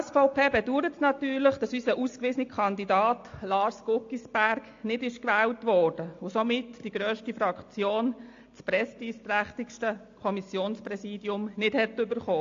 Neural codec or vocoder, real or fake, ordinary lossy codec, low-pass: none; real; AAC, 48 kbps; 7.2 kHz